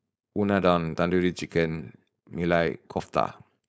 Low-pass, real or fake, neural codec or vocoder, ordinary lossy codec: none; fake; codec, 16 kHz, 4.8 kbps, FACodec; none